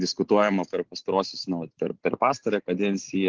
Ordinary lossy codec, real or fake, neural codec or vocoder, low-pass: Opus, 16 kbps; real; none; 7.2 kHz